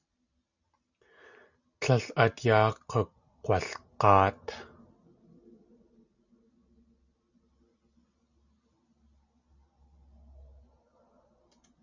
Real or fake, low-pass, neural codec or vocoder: real; 7.2 kHz; none